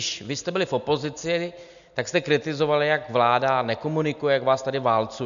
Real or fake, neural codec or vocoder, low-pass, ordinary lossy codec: real; none; 7.2 kHz; MP3, 96 kbps